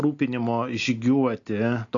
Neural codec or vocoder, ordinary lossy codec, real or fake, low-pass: none; AAC, 48 kbps; real; 7.2 kHz